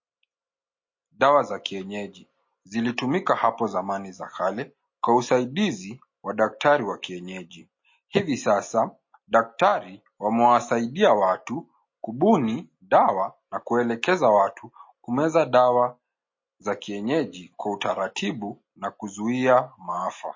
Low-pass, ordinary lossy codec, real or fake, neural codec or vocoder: 7.2 kHz; MP3, 32 kbps; real; none